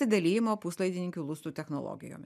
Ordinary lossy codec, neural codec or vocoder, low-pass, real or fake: MP3, 96 kbps; none; 14.4 kHz; real